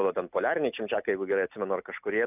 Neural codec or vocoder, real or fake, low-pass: none; real; 3.6 kHz